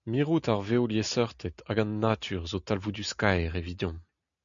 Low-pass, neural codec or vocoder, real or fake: 7.2 kHz; none; real